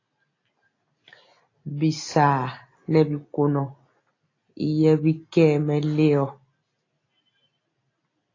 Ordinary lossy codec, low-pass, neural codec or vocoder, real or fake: AAC, 32 kbps; 7.2 kHz; none; real